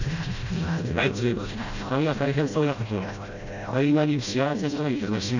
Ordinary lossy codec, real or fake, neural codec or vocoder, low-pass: none; fake; codec, 16 kHz, 0.5 kbps, FreqCodec, smaller model; 7.2 kHz